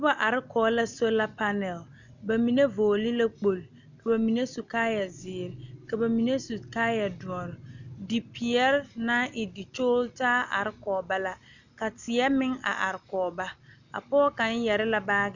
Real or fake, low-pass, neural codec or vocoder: real; 7.2 kHz; none